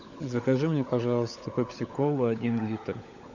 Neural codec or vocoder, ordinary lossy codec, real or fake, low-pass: codec, 16 kHz, 16 kbps, FunCodec, trained on LibriTTS, 50 frames a second; Opus, 64 kbps; fake; 7.2 kHz